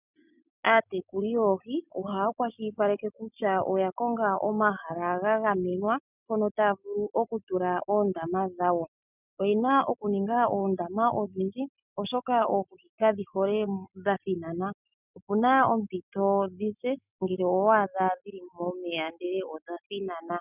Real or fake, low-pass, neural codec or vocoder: real; 3.6 kHz; none